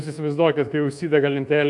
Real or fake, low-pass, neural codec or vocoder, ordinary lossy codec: fake; 10.8 kHz; codec, 24 kHz, 1.2 kbps, DualCodec; AAC, 64 kbps